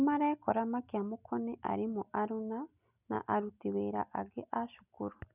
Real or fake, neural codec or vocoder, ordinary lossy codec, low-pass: real; none; none; 3.6 kHz